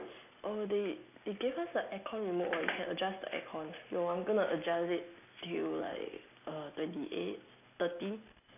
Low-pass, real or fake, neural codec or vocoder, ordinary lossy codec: 3.6 kHz; real; none; none